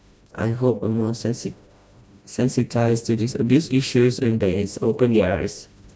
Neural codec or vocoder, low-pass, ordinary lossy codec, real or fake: codec, 16 kHz, 1 kbps, FreqCodec, smaller model; none; none; fake